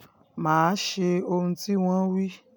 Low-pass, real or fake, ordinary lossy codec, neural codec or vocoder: none; real; none; none